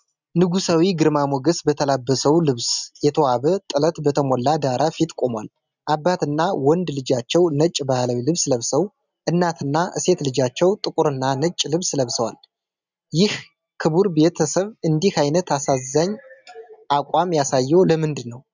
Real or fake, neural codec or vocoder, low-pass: real; none; 7.2 kHz